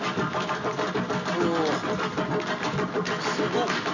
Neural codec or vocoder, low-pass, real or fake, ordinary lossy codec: none; 7.2 kHz; real; none